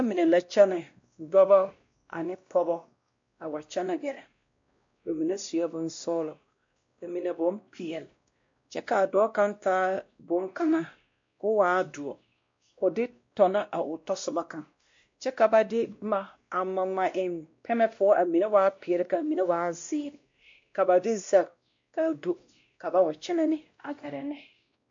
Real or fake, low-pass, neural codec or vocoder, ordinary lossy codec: fake; 7.2 kHz; codec, 16 kHz, 1 kbps, X-Codec, WavLM features, trained on Multilingual LibriSpeech; MP3, 48 kbps